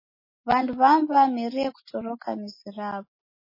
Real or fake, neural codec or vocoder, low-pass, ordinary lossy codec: real; none; 5.4 kHz; MP3, 24 kbps